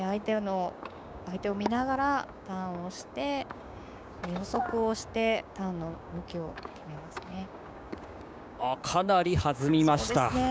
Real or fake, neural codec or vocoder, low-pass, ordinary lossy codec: fake; codec, 16 kHz, 6 kbps, DAC; none; none